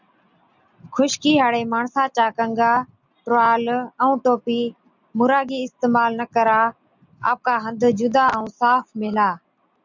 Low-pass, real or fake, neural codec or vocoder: 7.2 kHz; real; none